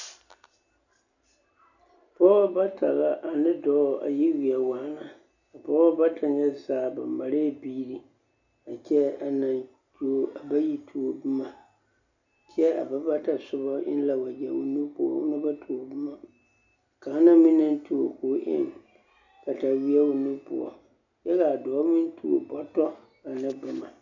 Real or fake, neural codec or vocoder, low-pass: real; none; 7.2 kHz